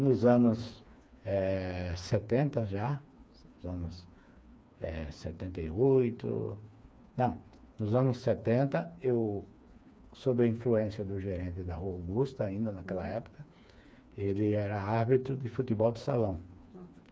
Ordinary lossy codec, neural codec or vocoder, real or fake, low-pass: none; codec, 16 kHz, 4 kbps, FreqCodec, smaller model; fake; none